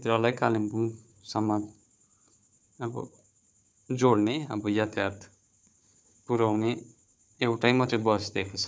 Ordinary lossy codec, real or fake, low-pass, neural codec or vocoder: none; fake; none; codec, 16 kHz, 4 kbps, FunCodec, trained on Chinese and English, 50 frames a second